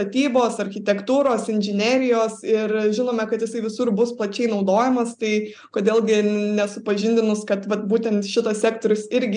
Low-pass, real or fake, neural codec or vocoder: 9.9 kHz; real; none